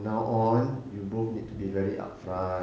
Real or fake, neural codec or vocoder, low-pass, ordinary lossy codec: real; none; none; none